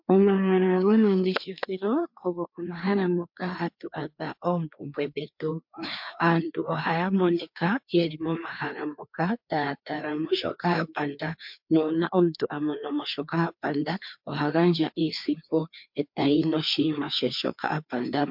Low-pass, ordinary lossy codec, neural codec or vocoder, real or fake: 5.4 kHz; MP3, 48 kbps; codec, 16 kHz, 2 kbps, FreqCodec, larger model; fake